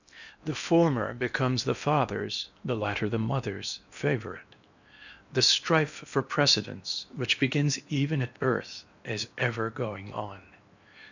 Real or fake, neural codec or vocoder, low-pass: fake; codec, 16 kHz in and 24 kHz out, 0.8 kbps, FocalCodec, streaming, 65536 codes; 7.2 kHz